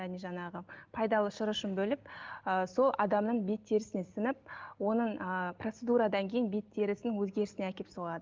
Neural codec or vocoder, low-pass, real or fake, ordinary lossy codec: none; 7.2 kHz; real; Opus, 32 kbps